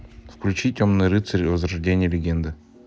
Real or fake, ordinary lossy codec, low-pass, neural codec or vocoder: real; none; none; none